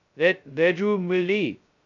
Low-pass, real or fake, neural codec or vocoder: 7.2 kHz; fake; codec, 16 kHz, 0.2 kbps, FocalCodec